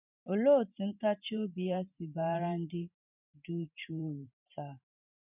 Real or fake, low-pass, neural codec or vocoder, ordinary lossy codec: fake; 3.6 kHz; vocoder, 44.1 kHz, 128 mel bands every 512 samples, BigVGAN v2; none